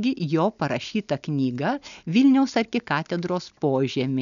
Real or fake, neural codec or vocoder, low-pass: real; none; 7.2 kHz